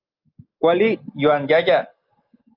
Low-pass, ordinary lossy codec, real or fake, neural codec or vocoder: 5.4 kHz; Opus, 32 kbps; real; none